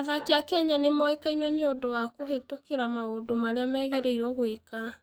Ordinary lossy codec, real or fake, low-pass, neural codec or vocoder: none; fake; none; codec, 44.1 kHz, 2.6 kbps, SNAC